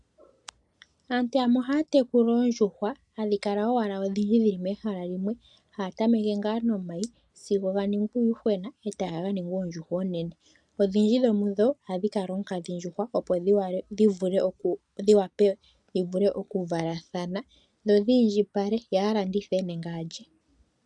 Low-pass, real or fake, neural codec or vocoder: 10.8 kHz; real; none